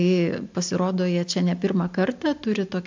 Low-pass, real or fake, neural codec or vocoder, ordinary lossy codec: 7.2 kHz; real; none; MP3, 64 kbps